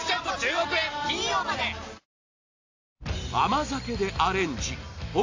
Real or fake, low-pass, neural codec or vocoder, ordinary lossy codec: real; 7.2 kHz; none; AAC, 48 kbps